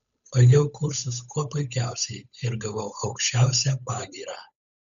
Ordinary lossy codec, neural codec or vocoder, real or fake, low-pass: AAC, 96 kbps; codec, 16 kHz, 8 kbps, FunCodec, trained on Chinese and English, 25 frames a second; fake; 7.2 kHz